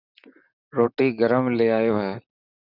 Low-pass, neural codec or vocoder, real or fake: 5.4 kHz; vocoder, 44.1 kHz, 128 mel bands, Pupu-Vocoder; fake